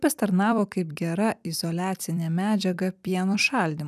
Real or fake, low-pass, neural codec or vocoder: fake; 14.4 kHz; vocoder, 44.1 kHz, 128 mel bands every 256 samples, BigVGAN v2